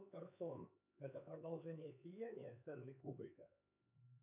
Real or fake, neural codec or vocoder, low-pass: fake; codec, 16 kHz, 4 kbps, X-Codec, HuBERT features, trained on LibriSpeech; 3.6 kHz